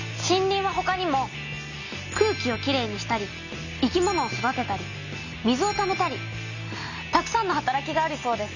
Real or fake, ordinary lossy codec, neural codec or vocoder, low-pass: real; none; none; 7.2 kHz